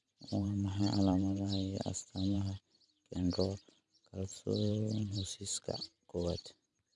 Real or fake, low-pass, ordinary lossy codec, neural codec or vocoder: fake; 10.8 kHz; none; vocoder, 44.1 kHz, 128 mel bands every 512 samples, BigVGAN v2